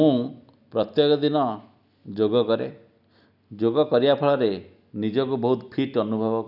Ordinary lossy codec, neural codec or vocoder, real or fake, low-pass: none; none; real; 5.4 kHz